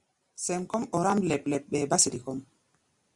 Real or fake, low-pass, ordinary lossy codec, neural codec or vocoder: real; 10.8 kHz; Opus, 64 kbps; none